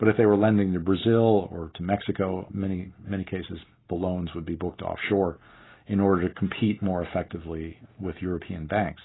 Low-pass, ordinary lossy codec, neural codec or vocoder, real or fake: 7.2 kHz; AAC, 16 kbps; none; real